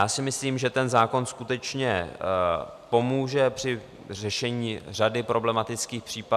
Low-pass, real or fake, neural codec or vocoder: 14.4 kHz; real; none